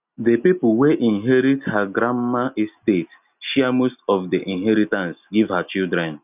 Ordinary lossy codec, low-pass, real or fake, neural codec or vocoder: none; 3.6 kHz; real; none